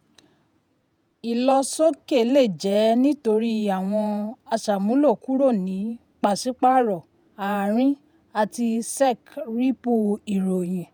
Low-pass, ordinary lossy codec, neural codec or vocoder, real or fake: none; none; vocoder, 48 kHz, 128 mel bands, Vocos; fake